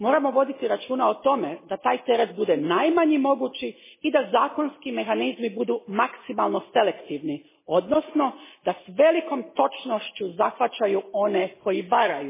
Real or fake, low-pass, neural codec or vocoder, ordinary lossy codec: real; 3.6 kHz; none; MP3, 16 kbps